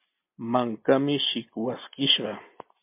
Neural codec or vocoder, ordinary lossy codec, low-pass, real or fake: none; MP3, 32 kbps; 3.6 kHz; real